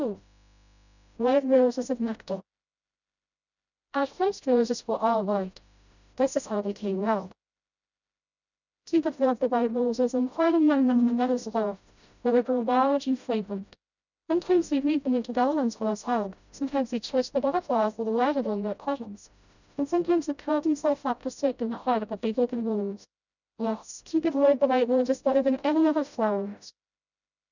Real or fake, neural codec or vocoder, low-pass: fake; codec, 16 kHz, 0.5 kbps, FreqCodec, smaller model; 7.2 kHz